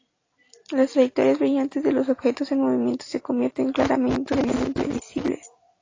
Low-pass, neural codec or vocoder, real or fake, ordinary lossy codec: 7.2 kHz; none; real; AAC, 32 kbps